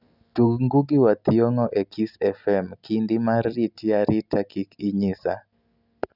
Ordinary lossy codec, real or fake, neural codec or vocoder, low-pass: none; real; none; 5.4 kHz